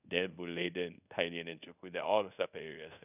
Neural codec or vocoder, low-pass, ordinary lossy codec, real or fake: codec, 24 kHz, 0.5 kbps, DualCodec; 3.6 kHz; none; fake